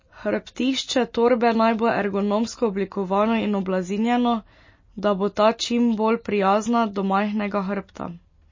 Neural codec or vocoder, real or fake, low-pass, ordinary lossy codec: none; real; 7.2 kHz; MP3, 32 kbps